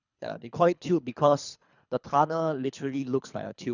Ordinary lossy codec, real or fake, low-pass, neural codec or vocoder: none; fake; 7.2 kHz; codec, 24 kHz, 3 kbps, HILCodec